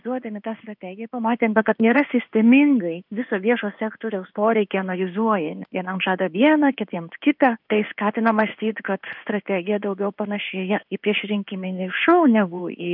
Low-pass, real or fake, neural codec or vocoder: 5.4 kHz; fake; codec, 16 kHz in and 24 kHz out, 1 kbps, XY-Tokenizer